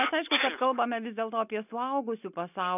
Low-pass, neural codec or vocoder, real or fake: 3.6 kHz; none; real